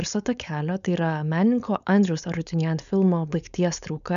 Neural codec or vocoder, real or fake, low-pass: codec, 16 kHz, 4.8 kbps, FACodec; fake; 7.2 kHz